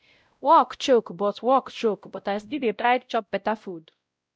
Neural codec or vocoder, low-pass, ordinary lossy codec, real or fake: codec, 16 kHz, 0.5 kbps, X-Codec, WavLM features, trained on Multilingual LibriSpeech; none; none; fake